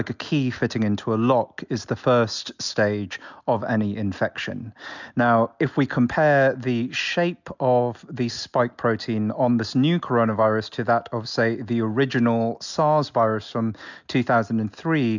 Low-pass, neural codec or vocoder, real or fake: 7.2 kHz; none; real